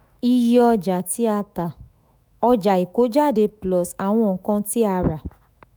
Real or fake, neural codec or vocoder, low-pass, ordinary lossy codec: fake; autoencoder, 48 kHz, 128 numbers a frame, DAC-VAE, trained on Japanese speech; none; none